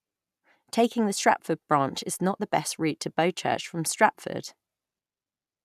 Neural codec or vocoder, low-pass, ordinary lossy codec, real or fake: none; 14.4 kHz; none; real